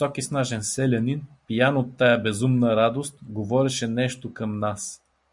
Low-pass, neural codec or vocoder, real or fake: 10.8 kHz; none; real